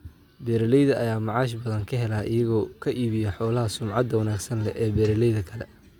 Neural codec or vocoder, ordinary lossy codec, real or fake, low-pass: none; none; real; 19.8 kHz